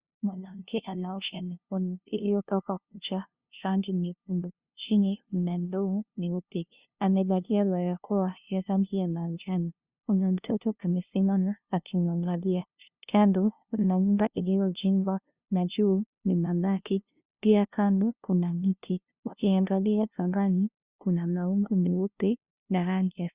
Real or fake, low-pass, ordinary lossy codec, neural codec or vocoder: fake; 3.6 kHz; Opus, 64 kbps; codec, 16 kHz, 0.5 kbps, FunCodec, trained on LibriTTS, 25 frames a second